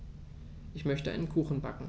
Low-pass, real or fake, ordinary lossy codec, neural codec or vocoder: none; real; none; none